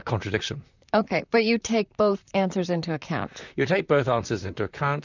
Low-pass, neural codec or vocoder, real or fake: 7.2 kHz; vocoder, 44.1 kHz, 128 mel bands, Pupu-Vocoder; fake